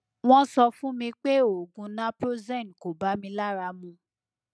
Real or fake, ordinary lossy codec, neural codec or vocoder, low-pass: real; none; none; none